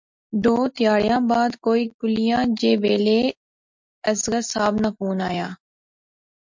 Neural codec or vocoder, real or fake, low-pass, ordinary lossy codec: none; real; 7.2 kHz; MP3, 64 kbps